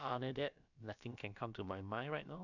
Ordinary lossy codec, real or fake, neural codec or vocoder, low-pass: none; fake; codec, 16 kHz, about 1 kbps, DyCAST, with the encoder's durations; 7.2 kHz